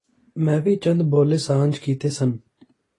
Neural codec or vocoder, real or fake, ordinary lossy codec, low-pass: none; real; AAC, 32 kbps; 10.8 kHz